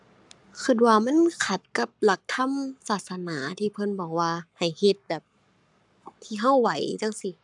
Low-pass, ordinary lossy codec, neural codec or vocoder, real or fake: 10.8 kHz; none; codec, 44.1 kHz, 7.8 kbps, Pupu-Codec; fake